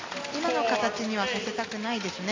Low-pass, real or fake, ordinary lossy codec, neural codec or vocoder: 7.2 kHz; real; AAC, 32 kbps; none